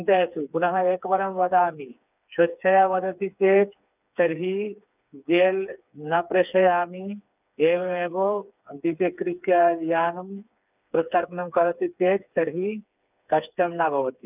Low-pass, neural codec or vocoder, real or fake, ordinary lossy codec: 3.6 kHz; codec, 16 kHz, 4 kbps, FreqCodec, smaller model; fake; none